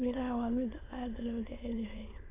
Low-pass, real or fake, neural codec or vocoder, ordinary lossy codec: 3.6 kHz; fake; autoencoder, 22.05 kHz, a latent of 192 numbers a frame, VITS, trained on many speakers; AAC, 16 kbps